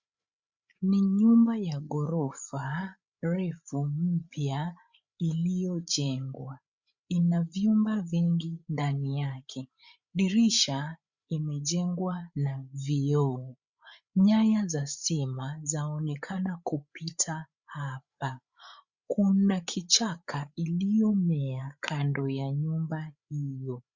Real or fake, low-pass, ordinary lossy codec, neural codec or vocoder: fake; 7.2 kHz; Opus, 64 kbps; codec, 16 kHz, 8 kbps, FreqCodec, larger model